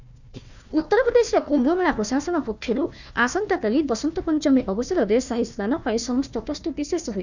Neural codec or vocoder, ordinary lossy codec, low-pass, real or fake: codec, 16 kHz, 1 kbps, FunCodec, trained on Chinese and English, 50 frames a second; none; 7.2 kHz; fake